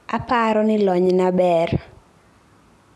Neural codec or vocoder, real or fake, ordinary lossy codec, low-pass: none; real; none; none